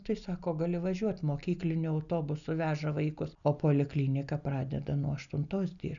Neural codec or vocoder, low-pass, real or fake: none; 7.2 kHz; real